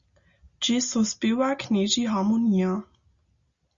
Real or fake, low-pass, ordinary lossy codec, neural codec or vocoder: real; 7.2 kHz; Opus, 64 kbps; none